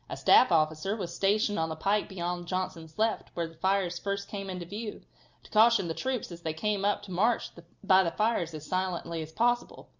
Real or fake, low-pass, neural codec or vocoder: real; 7.2 kHz; none